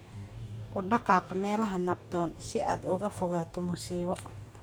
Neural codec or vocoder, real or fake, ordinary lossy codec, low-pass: codec, 44.1 kHz, 2.6 kbps, DAC; fake; none; none